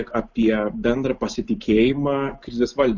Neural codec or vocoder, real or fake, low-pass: none; real; 7.2 kHz